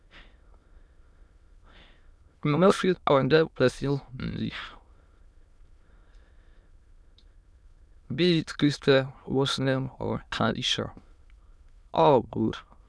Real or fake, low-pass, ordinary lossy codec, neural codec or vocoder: fake; none; none; autoencoder, 22.05 kHz, a latent of 192 numbers a frame, VITS, trained on many speakers